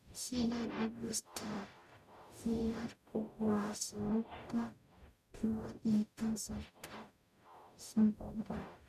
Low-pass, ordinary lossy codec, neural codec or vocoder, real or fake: 14.4 kHz; none; codec, 44.1 kHz, 0.9 kbps, DAC; fake